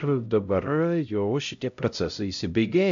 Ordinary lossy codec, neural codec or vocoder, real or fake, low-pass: MP3, 64 kbps; codec, 16 kHz, 0.5 kbps, X-Codec, HuBERT features, trained on LibriSpeech; fake; 7.2 kHz